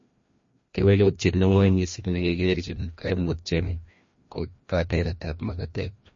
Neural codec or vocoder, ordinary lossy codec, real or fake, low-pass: codec, 16 kHz, 1 kbps, FreqCodec, larger model; MP3, 32 kbps; fake; 7.2 kHz